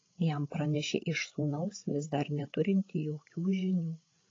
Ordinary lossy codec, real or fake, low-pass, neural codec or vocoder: AAC, 32 kbps; fake; 7.2 kHz; codec, 16 kHz, 8 kbps, FreqCodec, larger model